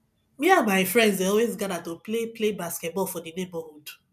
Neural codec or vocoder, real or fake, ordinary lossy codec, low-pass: none; real; none; 14.4 kHz